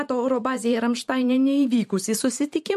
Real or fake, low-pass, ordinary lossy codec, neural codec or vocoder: fake; 14.4 kHz; MP3, 64 kbps; vocoder, 44.1 kHz, 128 mel bands every 512 samples, BigVGAN v2